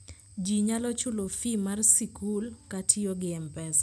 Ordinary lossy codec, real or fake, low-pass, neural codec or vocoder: none; real; none; none